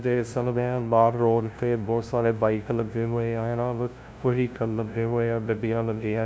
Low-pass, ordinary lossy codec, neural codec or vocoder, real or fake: none; none; codec, 16 kHz, 0.5 kbps, FunCodec, trained on LibriTTS, 25 frames a second; fake